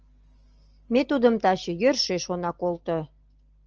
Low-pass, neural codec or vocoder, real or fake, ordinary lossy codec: 7.2 kHz; none; real; Opus, 24 kbps